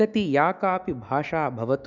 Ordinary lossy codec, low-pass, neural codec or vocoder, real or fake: none; 7.2 kHz; autoencoder, 48 kHz, 128 numbers a frame, DAC-VAE, trained on Japanese speech; fake